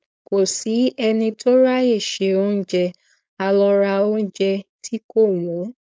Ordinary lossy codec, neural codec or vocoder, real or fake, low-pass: none; codec, 16 kHz, 4.8 kbps, FACodec; fake; none